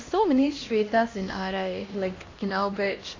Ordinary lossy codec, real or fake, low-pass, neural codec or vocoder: AAC, 32 kbps; fake; 7.2 kHz; codec, 16 kHz, 1 kbps, X-Codec, HuBERT features, trained on LibriSpeech